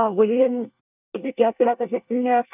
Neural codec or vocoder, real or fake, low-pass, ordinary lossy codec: codec, 24 kHz, 1 kbps, SNAC; fake; 3.6 kHz; none